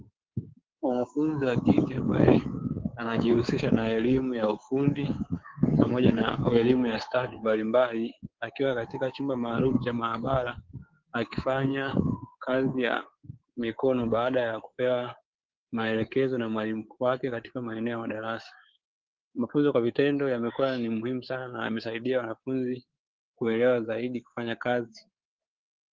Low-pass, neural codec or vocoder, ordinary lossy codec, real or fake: 7.2 kHz; codec, 24 kHz, 3.1 kbps, DualCodec; Opus, 16 kbps; fake